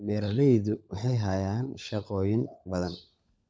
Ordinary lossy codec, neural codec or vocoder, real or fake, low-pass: none; codec, 16 kHz, 16 kbps, FunCodec, trained on LibriTTS, 50 frames a second; fake; none